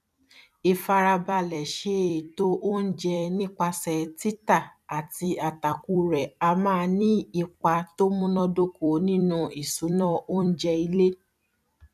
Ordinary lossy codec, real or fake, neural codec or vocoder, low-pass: none; fake; vocoder, 44.1 kHz, 128 mel bands every 256 samples, BigVGAN v2; 14.4 kHz